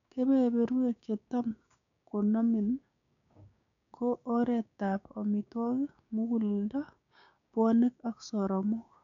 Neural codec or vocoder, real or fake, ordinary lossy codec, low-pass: codec, 16 kHz, 6 kbps, DAC; fake; none; 7.2 kHz